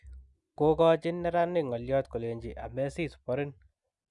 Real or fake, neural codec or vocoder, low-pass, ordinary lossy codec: real; none; 10.8 kHz; Opus, 64 kbps